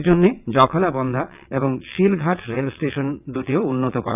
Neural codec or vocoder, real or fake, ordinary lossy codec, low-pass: vocoder, 22.05 kHz, 80 mel bands, WaveNeXt; fake; none; 3.6 kHz